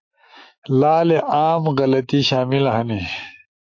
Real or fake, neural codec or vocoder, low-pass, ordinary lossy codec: fake; autoencoder, 48 kHz, 128 numbers a frame, DAC-VAE, trained on Japanese speech; 7.2 kHz; AAC, 48 kbps